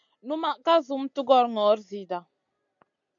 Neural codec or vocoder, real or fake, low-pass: none; real; 7.2 kHz